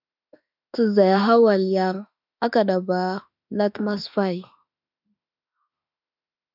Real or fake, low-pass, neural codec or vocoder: fake; 5.4 kHz; autoencoder, 48 kHz, 32 numbers a frame, DAC-VAE, trained on Japanese speech